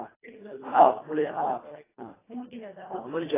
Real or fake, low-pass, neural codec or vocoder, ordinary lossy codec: fake; 3.6 kHz; codec, 24 kHz, 1.5 kbps, HILCodec; AAC, 16 kbps